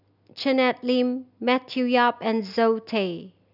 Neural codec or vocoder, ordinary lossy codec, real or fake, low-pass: none; none; real; 5.4 kHz